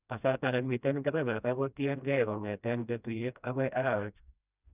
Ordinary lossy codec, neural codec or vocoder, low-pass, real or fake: none; codec, 16 kHz, 1 kbps, FreqCodec, smaller model; 3.6 kHz; fake